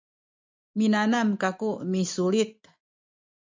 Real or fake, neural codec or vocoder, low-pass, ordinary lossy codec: real; none; 7.2 kHz; MP3, 64 kbps